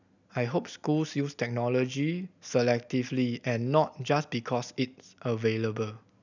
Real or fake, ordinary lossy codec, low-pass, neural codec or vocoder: real; none; 7.2 kHz; none